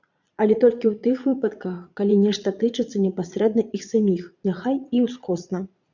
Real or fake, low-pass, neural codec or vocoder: fake; 7.2 kHz; vocoder, 22.05 kHz, 80 mel bands, Vocos